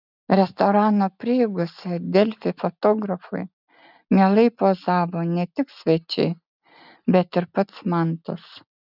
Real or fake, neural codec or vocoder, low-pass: real; none; 5.4 kHz